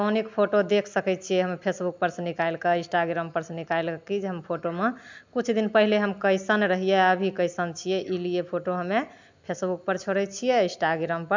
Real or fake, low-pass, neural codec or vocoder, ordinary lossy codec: real; 7.2 kHz; none; MP3, 64 kbps